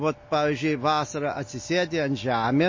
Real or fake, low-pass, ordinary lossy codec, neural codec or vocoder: real; 7.2 kHz; MP3, 32 kbps; none